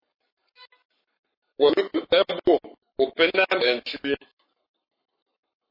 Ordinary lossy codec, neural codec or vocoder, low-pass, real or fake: MP3, 24 kbps; codec, 44.1 kHz, 7.8 kbps, Pupu-Codec; 5.4 kHz; fake